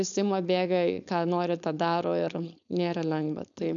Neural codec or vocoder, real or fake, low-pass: codec, 16 kHz, 4.8 kbps, FACodec; fake; 7.2 kHz